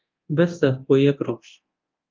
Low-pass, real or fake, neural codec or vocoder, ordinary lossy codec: 7.2 kHz; fake; codec, 24 kHz, 0.9 kbps, DualCodec; Opus, 32 kbps